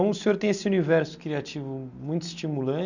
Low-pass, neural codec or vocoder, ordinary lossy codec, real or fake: 7.2 kHz; none; none; real